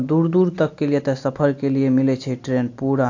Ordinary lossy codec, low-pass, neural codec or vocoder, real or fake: AAC, 48 kbps; 7.2 kHz; none; real